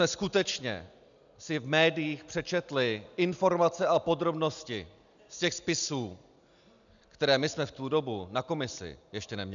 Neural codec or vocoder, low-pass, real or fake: none; 7.2 kHz; real